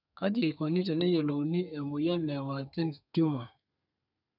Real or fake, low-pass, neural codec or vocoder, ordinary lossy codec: fake; 5.4 kHz; codec, 32 kHz, 1.9 kbps, SNAC; none